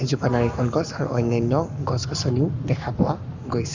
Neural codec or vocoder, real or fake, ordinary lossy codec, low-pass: codec, 44.1 kHz, 7.8 kbps, Pupu-Codec; fake; none; 7.2 kHz